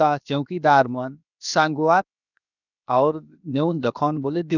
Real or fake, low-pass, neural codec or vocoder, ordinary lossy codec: fake; 7.2 kHz; codec, 16 kHz, 0.7 kbps, FocalCodec; none